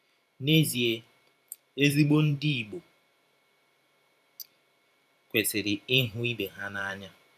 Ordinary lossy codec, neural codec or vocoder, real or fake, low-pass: none; vocoder, 44.1 kHz, 128 mel bands every 512 samples, BigVGAN v2; fake; 14.4 kHz